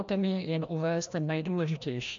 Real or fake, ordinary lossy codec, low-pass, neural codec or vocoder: fake; MP3, 64 kbps; 7.2 kHz; codec, 16 kHz, 1 kbps, FreqCodec, larger model